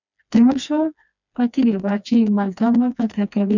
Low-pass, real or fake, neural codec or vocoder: 7.2 kHz; fake; codec, 16 kHz, 2 kbps, FreqCodec, smaller model